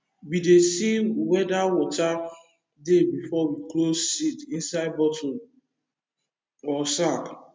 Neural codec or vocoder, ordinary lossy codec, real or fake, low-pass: none; none; real; none